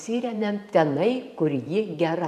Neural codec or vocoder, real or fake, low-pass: vocoder, 44.1 kHz, 128 mel bands every 512 samples, BigVGAN v2; fake; 14.4 kHz